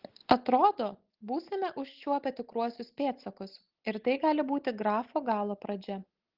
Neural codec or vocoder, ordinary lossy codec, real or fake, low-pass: none; Opus, 16 kbps; real; 5.4 kHz